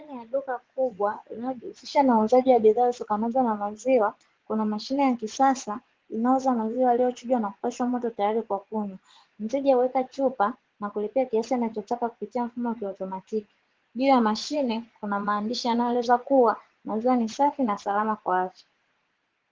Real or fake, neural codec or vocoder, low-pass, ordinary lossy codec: fake; vocoder, 44.1 kHz, 80 mel bands, Vocos; 7.2 kHz; Opus, 16 kbps